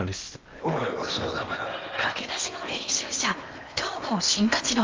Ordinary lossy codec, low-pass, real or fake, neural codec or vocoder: Opus, 32 kbps; 7.2 kHz; fake; codec, 16 kHz in and 24 kHz out, 0.8 kbps, FocalCodec, streaming, 65536 codes